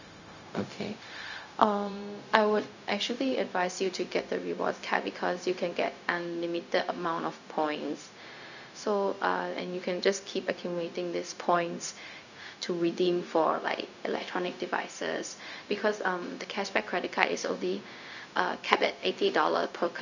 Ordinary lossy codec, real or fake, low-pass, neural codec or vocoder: none; fake; 7.2 kHz; codec, 16 kHz, 0.4 kbps, LongCat-Audio-Codec